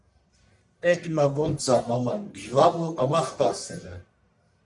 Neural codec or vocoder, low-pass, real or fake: codec, 44.1 kHz, 1.7 kbps, Pupu-Codec; 10.8 kHz; fake